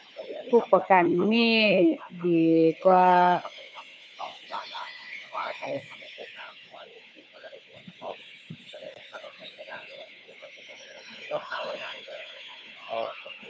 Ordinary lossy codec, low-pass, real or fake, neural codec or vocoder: none; none; fake; codec, 16 kHz, 4 kbps, FunCodec, trained on Chinese and English, 50 frames a second